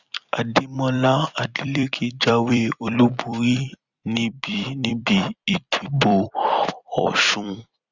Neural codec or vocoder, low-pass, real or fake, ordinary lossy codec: vocoder, 44.1 kHz, 128 mel bands every 256 samples, BigVGAN v2; 7.2 kHz; fake; Opus, 64 kbps